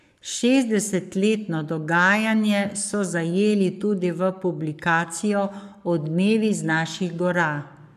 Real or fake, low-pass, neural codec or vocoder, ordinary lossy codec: fake; 14.4 kHz; codec, 44.1 kHz, 7.8 kbps, Pupu-Codec; none